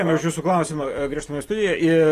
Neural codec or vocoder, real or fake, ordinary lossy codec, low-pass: vocoder, 44.1 kHz, 128 mel bands every 512 samples, BigVGAN v2; fake; AAC, 48 kbps; 14.4 kHz